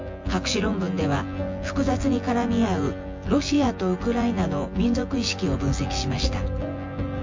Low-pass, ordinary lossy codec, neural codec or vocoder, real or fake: 7.2 kHz; MP3, 48 kbps; vocoder, 24 kHz, 100 mel bands, Vocos; fake